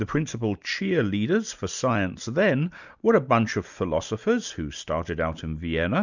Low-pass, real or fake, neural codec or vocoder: 7.2 kHz; real; none